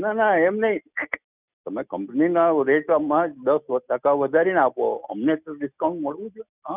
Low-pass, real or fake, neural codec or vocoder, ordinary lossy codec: 3.6 kHz; real; none; none